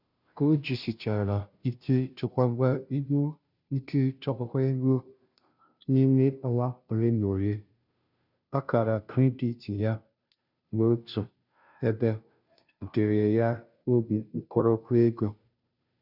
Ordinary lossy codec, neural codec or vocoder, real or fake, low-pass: none; codec, 16 kHz, 0.5 kbps, FunCodec, trained on Chinese and English, 25 frames a second; fake; 5.4 kHz